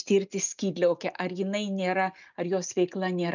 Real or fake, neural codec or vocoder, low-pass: real; none; 7.2 kHz